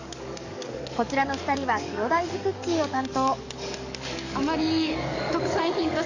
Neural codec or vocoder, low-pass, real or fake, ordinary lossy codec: codec, 44.1 kHz, 7.8 kbps, DAC; 7.2 kHz; fake; none